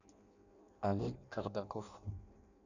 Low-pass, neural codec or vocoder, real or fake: 7.2 kHz; codec, 16 kHz in and 24 kHz out, 0.6 kbps, FireRedTTS-2 codec; fake